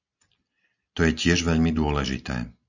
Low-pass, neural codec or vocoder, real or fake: 7.2 kHz; none; real